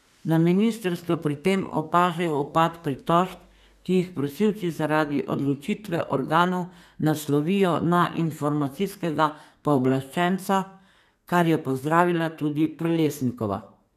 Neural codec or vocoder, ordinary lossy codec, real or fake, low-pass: codec, 32 kHz, 1.9 kbps, SNAC; none; fake; 14.4 kHz